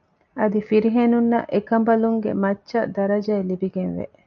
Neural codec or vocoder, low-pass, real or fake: none; 7.2 kHz; real